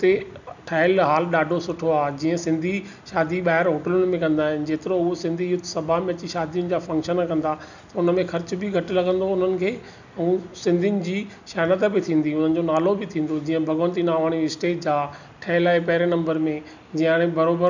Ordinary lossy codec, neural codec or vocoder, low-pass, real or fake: none; none; 7.2 kHz; real